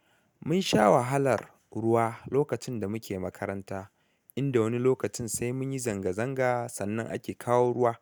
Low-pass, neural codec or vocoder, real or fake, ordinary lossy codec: none; none; real; none